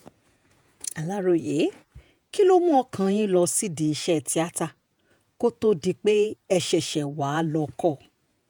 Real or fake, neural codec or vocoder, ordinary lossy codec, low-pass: fake; vocoder, 48 kHz, 128 mel bands, Vocos; none; none